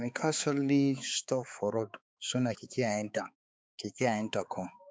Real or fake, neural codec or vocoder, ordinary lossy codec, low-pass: fake; codec, 16 kHz, 4 kbps, X-Codec, HuBERT features, trained on balanced general audio; none; none